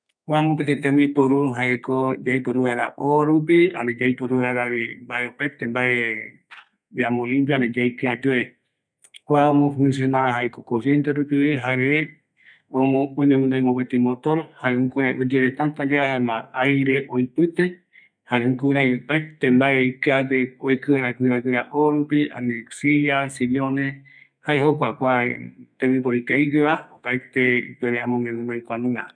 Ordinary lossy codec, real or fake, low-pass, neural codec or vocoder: MP3, 96 kbps; fake; 9.9 kHz; codec, 32 kHz, 1.9 kbps, SNAC